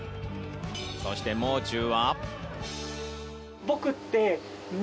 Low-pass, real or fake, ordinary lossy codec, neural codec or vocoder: none; real; none; none